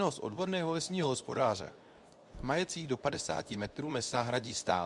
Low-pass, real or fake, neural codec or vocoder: 10.8 kHz; fake; codec, 24 kHz, 0.9 kbps, WavTokenizer, medium speech release version 2